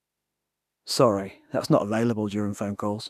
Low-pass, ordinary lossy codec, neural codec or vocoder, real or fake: 14.4 kHz; none; autoencoder, 48 kHz, 32 numbers a frame, DAC-VAE, trained on Japanese speech; fake